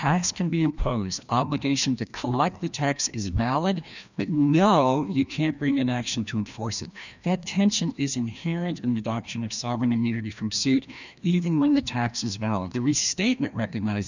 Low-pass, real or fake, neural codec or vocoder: 7.2 kHz; fake; codec, 16 kHz, 1 kbps, FreqCodec, larger model